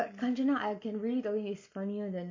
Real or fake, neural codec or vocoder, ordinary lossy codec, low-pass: real; none; MP3, 48 kbps; 7.2 kHz